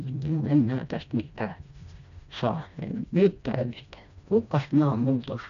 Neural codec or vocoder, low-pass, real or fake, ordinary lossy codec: codec, 16 kHz, 1 kbps, FreqCodec, smaller model; 7.2 kHz; fake; MP3, 64 kbps